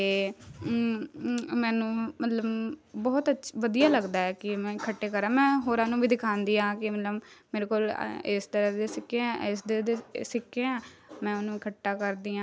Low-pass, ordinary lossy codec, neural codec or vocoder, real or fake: none; none; none; real